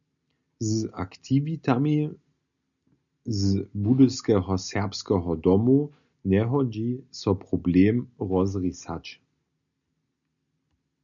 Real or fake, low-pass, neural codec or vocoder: real; 7.2 kHz; none